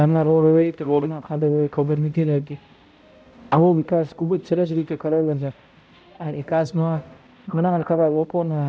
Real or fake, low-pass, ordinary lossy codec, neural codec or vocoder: fake; none; none; codec, 16 kHz, 0.5 kbps, X-Codec, HuBERT features, trained on balanced general audio